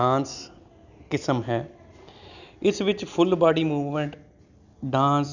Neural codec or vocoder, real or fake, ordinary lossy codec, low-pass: none; real; none; 7.2 kHz